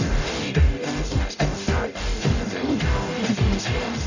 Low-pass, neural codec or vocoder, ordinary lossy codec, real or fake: 7.2 kHz; codec, 44.1 kHz, 0.9 kbps, DAC; none; fake